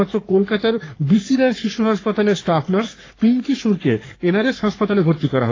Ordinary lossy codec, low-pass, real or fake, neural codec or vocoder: AAC, 32 kbps; 7.2 kHz; fake; codec, 44.1 kHz, 3.4 kbps, Pupu-Codec